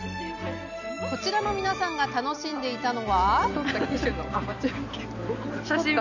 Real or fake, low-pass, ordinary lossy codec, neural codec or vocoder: real; 7.2 kHz; none; none